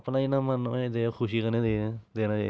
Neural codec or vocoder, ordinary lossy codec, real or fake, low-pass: none; none; real; none